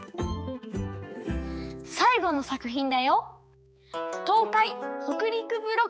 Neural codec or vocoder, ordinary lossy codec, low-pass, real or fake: codec, 16 kHz, 4 kbps, X-Codec, HuBERT features, trained on balanced general audio; none; none; fake